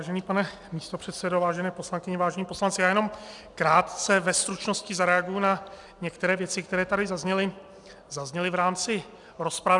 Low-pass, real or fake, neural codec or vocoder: 10.8 kHz; real; none